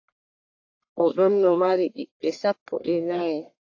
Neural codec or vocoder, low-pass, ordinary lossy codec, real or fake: codec, 44.1 kHz, 1.7 kbps, Pupu-Codec; 7.2 kHz; AAC, 48 kbps; fake